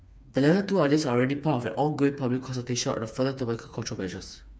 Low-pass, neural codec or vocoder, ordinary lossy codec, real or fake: none; codec, 16 kHz, 4 kbps, FreqCodec, smaller model; none; fake